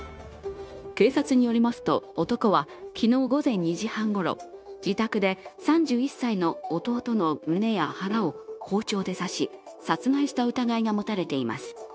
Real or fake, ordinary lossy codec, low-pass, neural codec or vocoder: fake; none; none; codec, 16 kHz, 0.9 kbps, LongCat-Audio-Codec